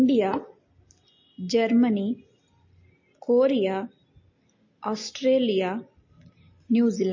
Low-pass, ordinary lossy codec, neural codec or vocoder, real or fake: 7.2 kHz; MP3, 32 kbps; none; real